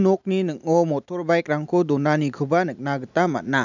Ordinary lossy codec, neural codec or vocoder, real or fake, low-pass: none; none; real; 7.2 kHz